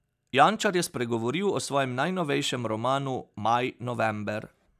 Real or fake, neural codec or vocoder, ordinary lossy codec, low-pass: real; none; none; 14.4 kHz